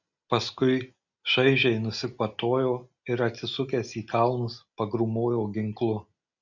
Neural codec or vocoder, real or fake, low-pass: none; real; 7.2 kHz